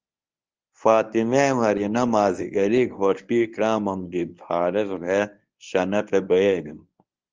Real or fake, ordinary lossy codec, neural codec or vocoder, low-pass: fake; Opus, 24 kbps; codec, 24 kHz, 0.9 kbps, WavTokenizer, medium speech release version 1; 7.2 kHz